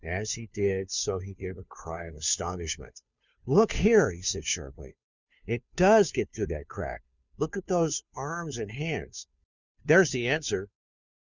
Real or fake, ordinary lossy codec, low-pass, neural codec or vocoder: fake; Opus, 64 kbps; 7.2 kHz; codec, 16 kHz, 2 kbps, FunCodec, trained on Chinese and English, 25 frames a second